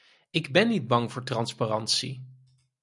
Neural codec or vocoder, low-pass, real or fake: none; 10.8 kHz; real